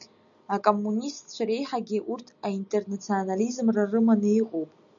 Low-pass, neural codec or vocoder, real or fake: 7.2 kHz; none; real